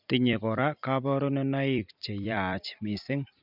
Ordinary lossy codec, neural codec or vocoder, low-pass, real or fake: none; vocoder, 44.1 kHz, 128 mel bands every 256 samples, BigVGAN v2; 5.4 kHz; fake